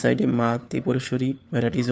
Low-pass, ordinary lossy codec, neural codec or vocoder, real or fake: none; none; codec, 16 kHz, 4 kbps, FunCodec, trained on LibriTTS, 50 frames a second; fake